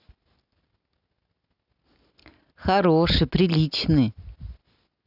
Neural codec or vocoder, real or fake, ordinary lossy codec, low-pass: none; real; none; 5.4 kHz